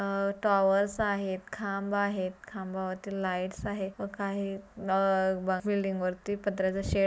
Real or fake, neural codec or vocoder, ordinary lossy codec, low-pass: real; none; none; none